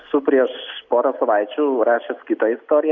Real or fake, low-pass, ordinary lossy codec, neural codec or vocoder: real; 7.2 kHz; MP3, 48 kbps; none